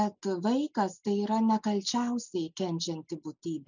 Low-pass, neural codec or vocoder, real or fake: 7.2 kHz; none; real